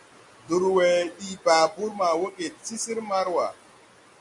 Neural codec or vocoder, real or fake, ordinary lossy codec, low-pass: none; real; MP3, 48 kbps; 10.8 kHz